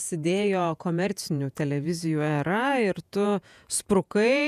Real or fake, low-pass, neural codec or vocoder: fake; 14.4 kHz; vocoder, 48 kHz, 128 mel bands, Vocos